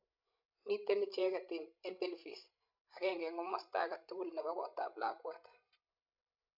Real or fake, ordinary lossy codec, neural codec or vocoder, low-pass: fake; MP3, 48 kbps; codec, 16 kHz, 16 kbps, FreqCodec, larger model; 5.4 kHz